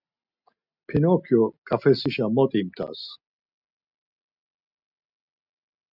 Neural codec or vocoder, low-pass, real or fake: none; 5.4 kHz; real